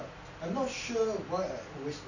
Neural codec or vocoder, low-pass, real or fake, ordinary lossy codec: none; 7.2 kHz; real; none